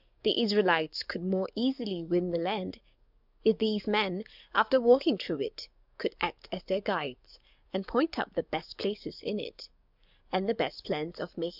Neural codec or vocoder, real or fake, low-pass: codec, 44.1 kHz, 7.8 kbps, DAC; fake; 5.4 kHz